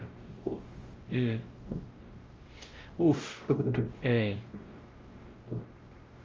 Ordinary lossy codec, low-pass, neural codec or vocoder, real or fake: Opus, 32 kbps; 7.2 kHz; codec, 16 kHz, 0.5 kbps, X-Codec, WavLM features, trained on Multilingual LibriSpeech; fake